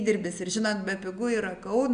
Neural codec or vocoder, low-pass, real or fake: none; 9.9 kHz; real